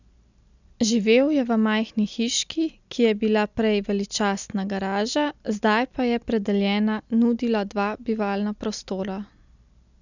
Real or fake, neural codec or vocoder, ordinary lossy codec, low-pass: real; none; none; 7.2 kHz